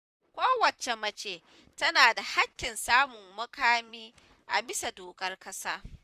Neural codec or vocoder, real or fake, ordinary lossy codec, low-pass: vocoder, 44.1 kHz, 128 mel bands, Pupu-Vocoder; fake; none; 14.4 kHz